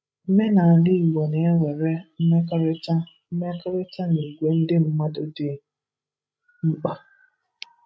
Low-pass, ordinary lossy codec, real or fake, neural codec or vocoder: none; none; fake; codec, 16 kHz, 16 kbps, FreqCodec, larger model